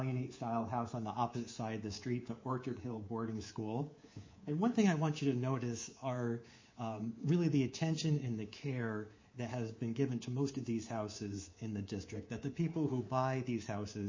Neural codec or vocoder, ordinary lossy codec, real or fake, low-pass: codec, 24 kHz, 3.1 kbps, DualCodec; MP3, 32 kbps; fake; 7.2 kHz